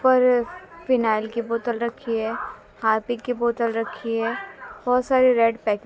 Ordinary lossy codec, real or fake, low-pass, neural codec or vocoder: none; real; none; none